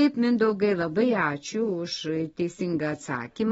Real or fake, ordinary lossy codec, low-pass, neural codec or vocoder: real; AAC, 24 kbps; 10.8 kHz; none